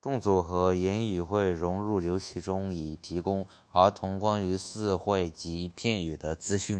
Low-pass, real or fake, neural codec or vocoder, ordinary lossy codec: 9.9 kHz; fake; codec, 24 kHz, 1.2 kbps, DualCodec; AAC, 64 kbps